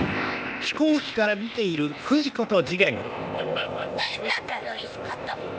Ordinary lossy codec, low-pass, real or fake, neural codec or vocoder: none; none; fake; codec, 16 kHz, 0.8 kbps, ZipCodec